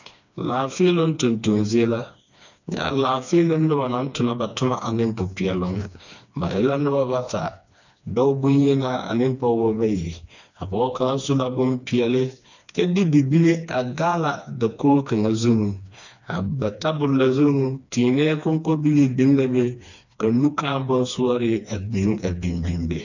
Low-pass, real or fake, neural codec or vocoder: 7.2 kHz; fake; codec, 16 kHz, 2 kbps, FreqCodec, smaller model